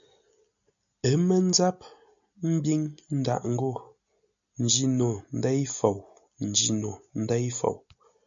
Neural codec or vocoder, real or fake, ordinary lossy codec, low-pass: none; real; MP3, 96 kbps; 7.2 kHz